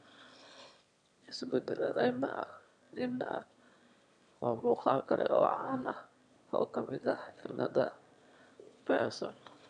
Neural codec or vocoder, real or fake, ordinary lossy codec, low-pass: autoencoder, 22.05 kHz, a latent of 192 numbers a frame, VITS, trained on one speaker; fake; MP3, 64 kbps; 9.9 kHz